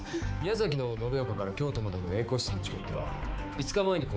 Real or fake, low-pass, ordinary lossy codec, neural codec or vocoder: fake; none; none; codec, 16 kHz, 4 kbps, X-Codec, HuBERT features, trained on balanced general audio